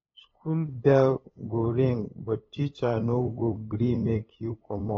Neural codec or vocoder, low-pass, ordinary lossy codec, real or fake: codec, 16 kHz, 8 kbps, FunCodec, trained on LibriTTS, 25 frames a second; 7.2 kHz; AAC, 24 kbps; fake